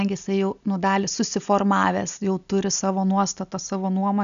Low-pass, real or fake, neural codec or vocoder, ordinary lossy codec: 7.2 kHz; real; none; MP3, 96 kbps